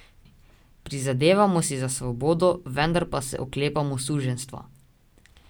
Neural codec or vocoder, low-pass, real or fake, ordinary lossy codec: none; none; real; none